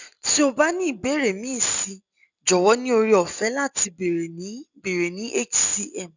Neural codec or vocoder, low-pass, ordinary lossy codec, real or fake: none; 7.2 kHz; none; real